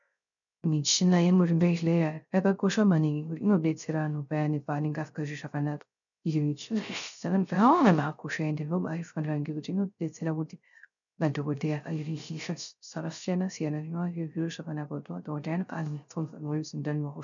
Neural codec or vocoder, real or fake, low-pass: codec, 16 kHz, 0.3 kbps, FocalCodec; fake; 7.2 kHz